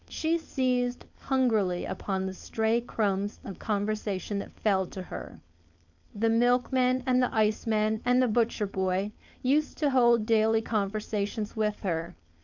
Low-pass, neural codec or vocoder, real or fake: 7.2 kHz; codec, 16 kHz, 4.8 kbps, FACodec; fake